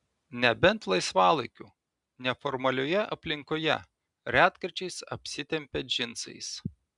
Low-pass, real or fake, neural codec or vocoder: 10.8 kHz; real; none